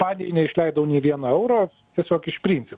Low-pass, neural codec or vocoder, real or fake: 9.9 kHz; none; real